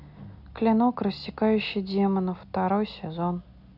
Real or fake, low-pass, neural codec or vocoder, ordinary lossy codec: real; 5.4 kHz; none; none